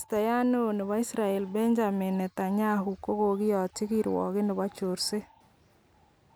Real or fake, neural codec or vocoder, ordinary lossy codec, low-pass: real; none; none; none